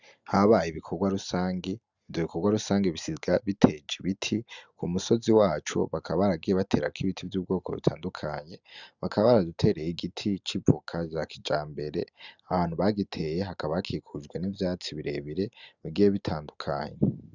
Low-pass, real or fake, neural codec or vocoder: 7.2 kHz; real; none